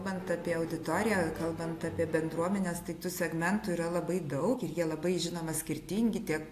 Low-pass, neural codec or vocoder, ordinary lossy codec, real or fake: 14.4 kHz; none; AAC, 64 kbps; real